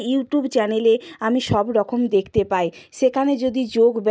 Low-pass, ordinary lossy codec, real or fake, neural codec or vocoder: none; none; real; none